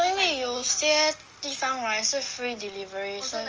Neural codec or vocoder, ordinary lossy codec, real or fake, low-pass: none; Opus, 24 kbps; real; 7.2 kHz